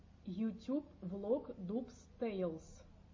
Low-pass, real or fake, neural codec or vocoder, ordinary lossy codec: 7.2 kHz; real; none; MP3, 32 kbps